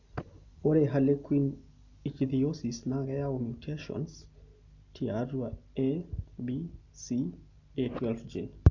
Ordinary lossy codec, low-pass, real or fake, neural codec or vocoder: none; 7.2 kHz; real; none